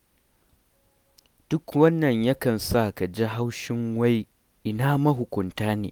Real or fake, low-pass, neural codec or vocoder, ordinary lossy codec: real; none; none; none